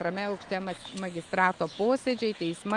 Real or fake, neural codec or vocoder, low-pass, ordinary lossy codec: fake; autoencoder, 48 kHz, 128 numbers a frame, DAC-VAE, trained on Japanese speech; 10.8 kHz; Opus, 32 kbps